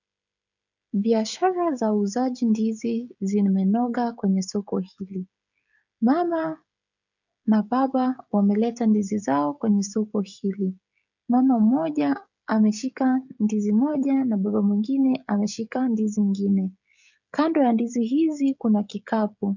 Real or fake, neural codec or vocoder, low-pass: fake; codec, 16 kHz, 16 kbps, FreqCodec, smaller model; 7.2 kHz